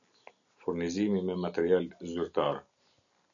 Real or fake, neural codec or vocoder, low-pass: real; none; 7.2 kHz